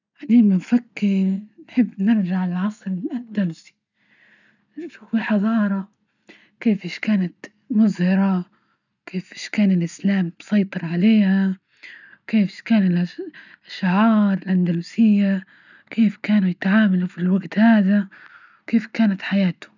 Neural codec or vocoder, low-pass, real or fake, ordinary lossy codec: none; 7.2 kHz; real; none